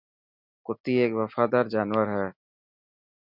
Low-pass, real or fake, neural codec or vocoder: 5.4 kHz; real; none